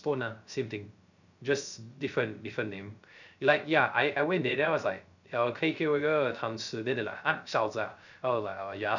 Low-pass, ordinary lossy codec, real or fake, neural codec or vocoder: 7.2 kHz; none; fake; codec, 16 kHz, 0.3 kbps, FocalCodec